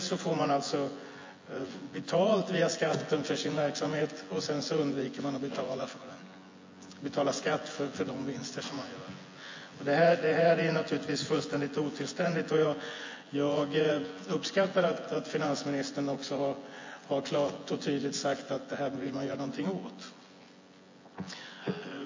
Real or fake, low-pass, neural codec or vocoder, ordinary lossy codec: fake; 7.2 kHz; vocoder, 24 kHz, 100 mel bands, Vocos; MP3, 32 kbps